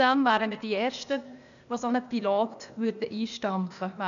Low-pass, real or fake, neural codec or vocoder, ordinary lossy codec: 7.2 kHz; fake; codec, 16 kHz, 0.8 kbps, ZipCodec; none